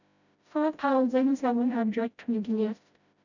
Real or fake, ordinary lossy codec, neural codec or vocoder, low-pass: fake; none; codec, 16 kHz, 0.5 kbps, FreqCodec, smaller model; 7.2 kHz